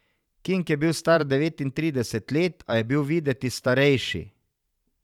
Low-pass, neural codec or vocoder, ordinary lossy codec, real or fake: 19.8 kHz; vocoder, 44.1 kHz, 128 mel bands every 512 samples, BigVGAN v2; none; fake